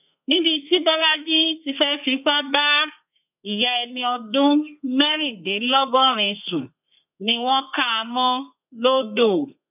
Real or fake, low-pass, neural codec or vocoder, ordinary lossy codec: fake; 3.6 kHz; codec, 32 kHz, 1.9 kbps, SNAC; none